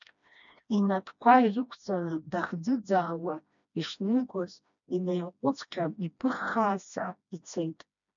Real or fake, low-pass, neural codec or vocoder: fake; 7.2 kHz; codec, 16 kHz, 1 kbps, FreqCodec, smaller model